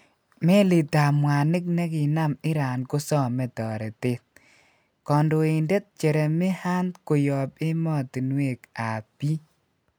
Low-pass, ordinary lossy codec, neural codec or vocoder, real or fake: none; none; none; real